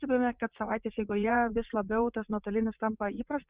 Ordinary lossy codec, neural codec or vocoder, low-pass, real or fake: Opus, 64 kbps; vocoder, 44.1 kHz, 80 mel bands, Vocos; 3.6 kHz; fake